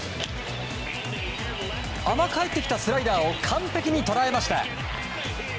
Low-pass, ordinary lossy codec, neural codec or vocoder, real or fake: none; none; none; real